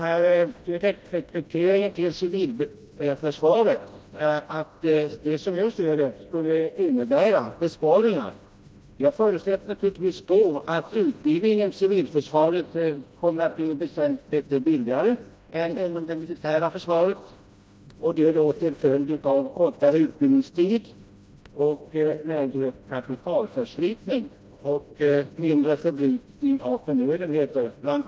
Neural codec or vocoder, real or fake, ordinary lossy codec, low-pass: codec, 16 kHz, 1 kbps, FreqCodec, smaller model; fake; none; none